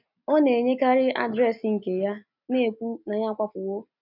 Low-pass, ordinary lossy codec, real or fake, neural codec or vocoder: 5.4 kHz; none; real; none